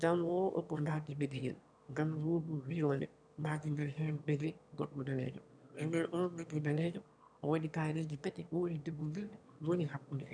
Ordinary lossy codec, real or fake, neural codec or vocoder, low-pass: none; fake; autoencoder, 22.05 kHz, a latent of 192 numbers a frame, VITS, trained on one speaker; 9.9 kHz